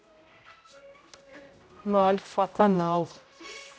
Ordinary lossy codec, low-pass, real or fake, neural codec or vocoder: none; none; fake; codec, 16 kHz, 0.5 kbps, X-Codec, HuBERT features, trained on general audio